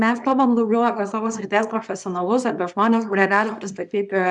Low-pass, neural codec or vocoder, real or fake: 10.8 kHz; codec, 24 kHz, 0.9 kbps, WavTokenizer, small release; fake